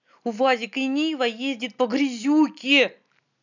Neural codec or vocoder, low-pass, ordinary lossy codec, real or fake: none; 7.2 kHz; none; real